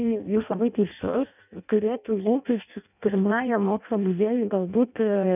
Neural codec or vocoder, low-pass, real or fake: codec, 16 kHz in and 24 kHz out, 0.6 kbps, FireRedTTS-2 codec; 3.6 kHz; fake